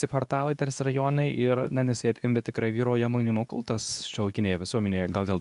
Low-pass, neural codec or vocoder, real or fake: 10.8 kHz; codec, 24 kHz, 0.9 kbps, WavTokenizer, medium speech release version 2; fake